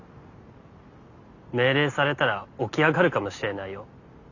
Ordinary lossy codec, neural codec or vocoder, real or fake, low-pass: Opus, 64 kbps; none; real; 7.2 kHz